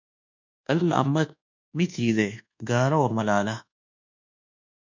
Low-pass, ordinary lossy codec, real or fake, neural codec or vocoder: 7.2 kHz; MP3, 64 kbps; fake; codec, 24 kHz, 1.2 kbps, DualCodec